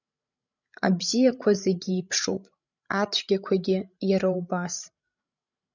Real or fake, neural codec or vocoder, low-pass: fake; codec, 16 kHz, 16 kbps, FreqCodec, larger model; 7.2 kHz